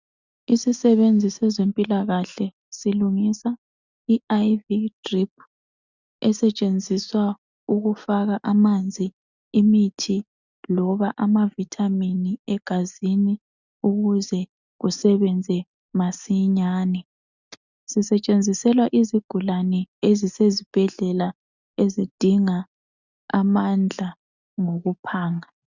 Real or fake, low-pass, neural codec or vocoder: real; 7.2 kHz; none